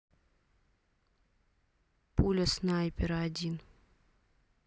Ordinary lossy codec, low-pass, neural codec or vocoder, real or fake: none; none; none; real